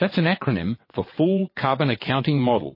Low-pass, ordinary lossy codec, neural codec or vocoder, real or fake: 5.4 kHz; MP3, 24 kbps; vocoder, 22.05 kHz, 80 mel bands, WaveNeXt; fake